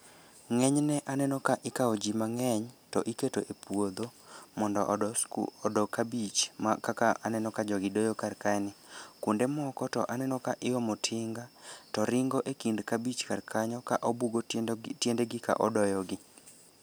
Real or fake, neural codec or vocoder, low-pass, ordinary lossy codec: real; none; none; none